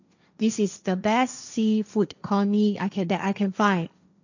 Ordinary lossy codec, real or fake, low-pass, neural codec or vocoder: none; fake; 7.2 kHz; codec, 16 kHz, 1.1 kbps, Voila-Tokenizer